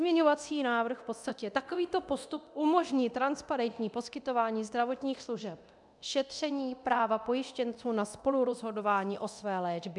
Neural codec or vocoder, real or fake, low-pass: codec, 24 kHz, 0.9 kbps, DualCodec; fake; 10.8 kHz